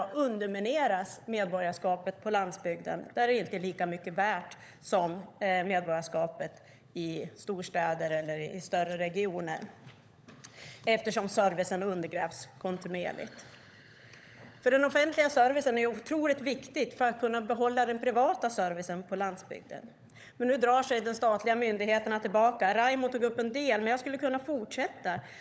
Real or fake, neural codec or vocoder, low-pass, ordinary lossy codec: fake; codec, 16 kHz, 16 kbps, FunCodec, trained on LibriTTS, 50 frames a second; none; none